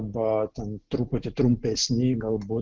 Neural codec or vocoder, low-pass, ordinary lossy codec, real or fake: none; 7.2 kHz; Opus, 16 kbps; real